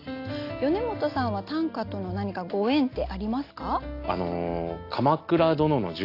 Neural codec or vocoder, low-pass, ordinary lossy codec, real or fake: none; 5.4 kHz; AAC, 32 kbps; real